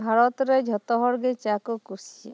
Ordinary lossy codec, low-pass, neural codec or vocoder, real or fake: none; none; none; real